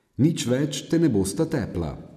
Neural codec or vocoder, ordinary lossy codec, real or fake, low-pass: none; none; real; 14.4 kHz